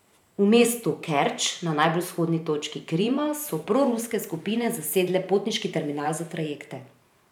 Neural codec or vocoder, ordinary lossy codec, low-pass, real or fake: vocoder, 44.1 kHz, 128 mel bands every 512 samples, BigVGAN v2; none; 19.8 kHz; fake